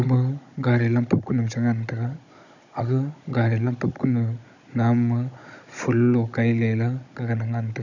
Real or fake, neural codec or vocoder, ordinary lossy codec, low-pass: fake; codec, 16 kHz, 16 kbps, FunCodec, trained on Chinese and English, 50 frames a second; none; 7.2 kHz